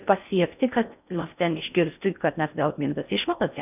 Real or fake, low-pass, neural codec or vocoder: fake; 3.6 kHz; codec, 16 kHz in and 24 kHz out, 0.6 kbps, FocalCodec, streaming, 4096 codes